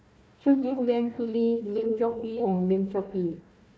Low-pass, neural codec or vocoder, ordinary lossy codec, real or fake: none; codec, 16 kHz, 1 kbps, FunCodec, trained on Chinese and English, 50 frames a second; none; fake